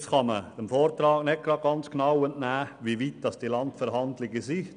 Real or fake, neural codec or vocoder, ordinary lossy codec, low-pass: real; none; none; 9.9 kHz